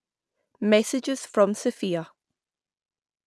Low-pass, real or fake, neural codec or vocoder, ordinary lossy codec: none; real; none; none